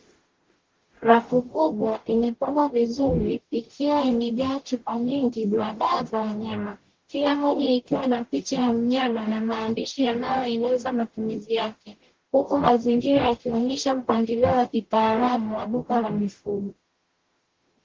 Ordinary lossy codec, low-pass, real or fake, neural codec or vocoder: Opus, 16 kbps; 7.2 kHz; fake; codec, 44.1 kHz, 0.9 kbps, DAC